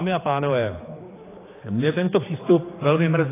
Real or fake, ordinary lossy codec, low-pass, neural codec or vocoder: fake; AAC, 16 kbps; 3.6 kHz; codec, 16 kHz, 4 kbps, X-Codec, HuBERT features, trained on general audio